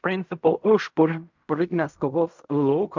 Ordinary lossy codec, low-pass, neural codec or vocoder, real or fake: MP3, 64 kbps; 7.2 kHz; codec, 16 kHz in and 24 kHz out, 0.4 kbps, LongCat-Audio-Codec, fine tuned four codebook decoder; fake